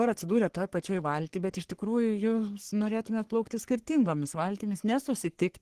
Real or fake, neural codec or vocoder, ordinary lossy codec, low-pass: fake; codec, 44.1 kHz, 3.4 kbps, Pupu-Codec; Opus, 16 kbps; 14.4 kHz